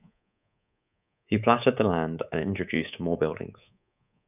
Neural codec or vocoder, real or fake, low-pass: codec, 24 kHz, 3.1 kbps, DualCodec; fake; 3.6 kHz